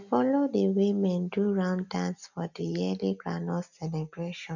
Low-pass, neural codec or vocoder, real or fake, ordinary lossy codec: 7.2 kHz; none; real; none